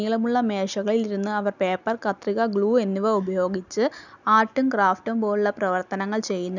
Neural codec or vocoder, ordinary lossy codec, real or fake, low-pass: none; none; real; 7.2 kHz